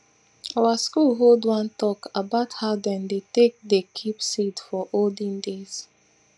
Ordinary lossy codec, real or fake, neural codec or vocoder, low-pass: none; real; none; none